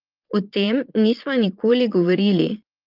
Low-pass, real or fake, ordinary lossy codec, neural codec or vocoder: 5.4 kHz; fake; Opus, 32 kbps; vocoder, 22.05 kHz, 80 mel bands, Vocos